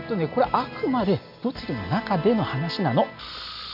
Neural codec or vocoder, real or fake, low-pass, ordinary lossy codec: none; real; 5.4 kHz; none